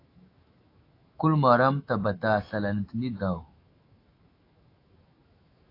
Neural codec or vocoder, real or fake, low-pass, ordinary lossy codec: autoencoder, 48 kHz, 128 numbers a frame, DAC-VAE, trained on Japanese speech; fake; 5.4 kHz; AAC, 32 kbps